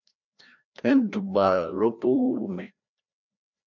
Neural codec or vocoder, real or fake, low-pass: codec, 16 kHz, 1 kbps, FreqCodec, larger model; fake; 7.2 kHz